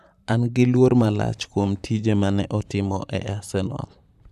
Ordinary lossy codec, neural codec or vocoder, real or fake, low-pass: none; vocoder, 44.1 kHz, 128 mel bands, Pupu-Vocoder; fake; 14.4 kHz